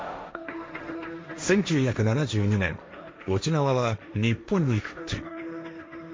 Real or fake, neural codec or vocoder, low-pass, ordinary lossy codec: fake; codec, 16 kHz, 1.1 kbps, Voila-Tokenizer; none; none